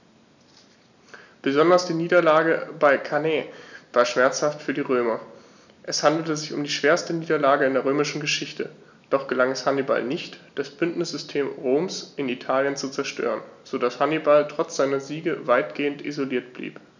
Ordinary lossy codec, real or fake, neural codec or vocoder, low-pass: none; real; none; 7.2 kHz